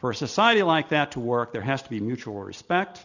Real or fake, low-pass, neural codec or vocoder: real; 7.2 kHz; none